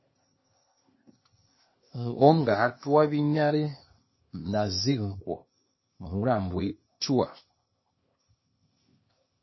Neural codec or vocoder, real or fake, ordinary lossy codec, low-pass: codec, 16 kHz, 0.8 kbps, ZipCodec; fake; MP3, 24 kbps; 7.2 kHz